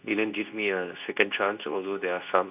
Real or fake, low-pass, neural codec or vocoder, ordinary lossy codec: fake; 3.6 kHz; codec, 24 kHz, 0.9 kbps, WavTokenizer, medium speech release version 2; none